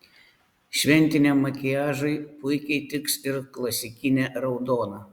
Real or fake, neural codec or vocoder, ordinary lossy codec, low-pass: real; none; Opus, 64 kbps; 19.8 kHz